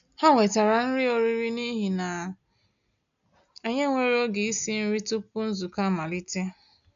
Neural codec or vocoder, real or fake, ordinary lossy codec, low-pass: none; real; AAC, 96 kbps; 7.2 kHz